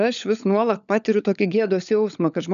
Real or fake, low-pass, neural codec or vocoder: fake; 7.2 kHz; codec, 16 kHz, 16 kbps, FunCodec, trained on LibriTTS, 50 frames a second